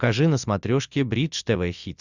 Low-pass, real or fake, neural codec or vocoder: 7.2 kHz; real; none